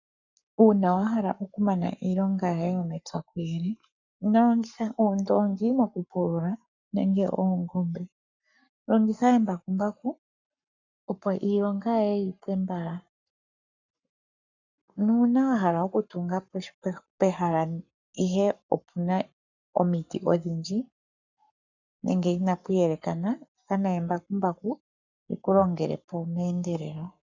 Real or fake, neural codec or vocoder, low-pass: fake; codec, 44.1 kHz, 7.8 kbps, Pupu-Codec; 7.2 kHz